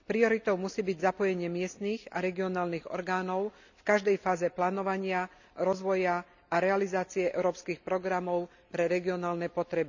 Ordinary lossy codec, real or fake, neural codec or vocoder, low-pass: none; real; none; 7.2 kHz